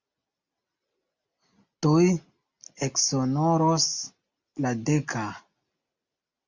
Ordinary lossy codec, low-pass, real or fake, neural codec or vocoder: Opus, 64 kbps; 7.2 kHz; real; none